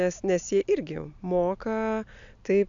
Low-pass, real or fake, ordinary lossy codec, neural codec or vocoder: 7.2 kHz; real; AAC, 64 kbps; none